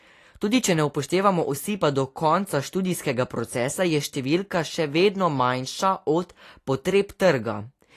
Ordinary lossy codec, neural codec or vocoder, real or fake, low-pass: AAC, 48 kbps; none; real; 14.4 kHz